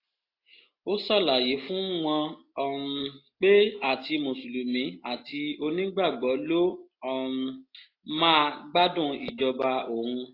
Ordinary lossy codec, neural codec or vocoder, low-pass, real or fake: AAC, 32 kbps; none; 5.4 kHz; real